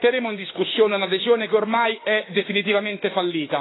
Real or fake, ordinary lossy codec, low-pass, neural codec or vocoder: fake; AAC, 16 kbps; 7.2 kHz; autoencoder, 48 kHz, 32 numbers a frame, DAC-VAE, trained on Japanese speech